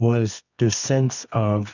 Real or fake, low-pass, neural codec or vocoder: fake; 7.2 kHz; codec, 24 kHz, 0.9 kbps, WavTokenizer, medium music audio release